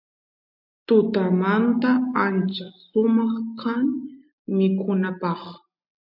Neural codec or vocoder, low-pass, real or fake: none; 5.4 kHz; real